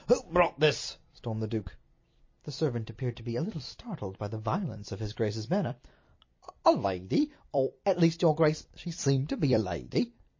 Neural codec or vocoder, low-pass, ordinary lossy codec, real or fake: vocoder, 44.1 kHz, 128 mel bands every 256 samples, BigVGAN v2; 7.2 kHz; MP3, 32 kbps; fake